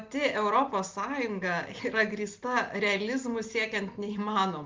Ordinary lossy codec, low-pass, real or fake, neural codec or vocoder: Opus, 24 kbps; 7.2 kHz; real; none